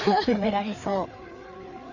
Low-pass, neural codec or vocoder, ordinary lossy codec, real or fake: 7.2 kHz; codec, 16 kHz, 4 kbps, FreqCodec, larger model; none; fake